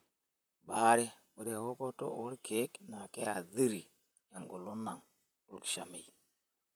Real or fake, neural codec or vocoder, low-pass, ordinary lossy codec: fake; vocoder, 44.1 kHz, 128 mel bands, Pupu-Vocoder; none; none